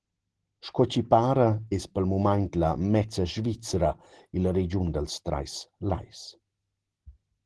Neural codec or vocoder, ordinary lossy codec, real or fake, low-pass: none; Opus, 16 kbps; real; 10.8 kHz